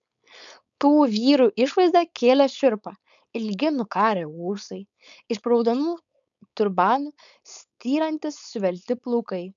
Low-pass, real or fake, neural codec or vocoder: 7.2 kHz; fake; codec, 16 kHz, 4.8 kbps, FACodec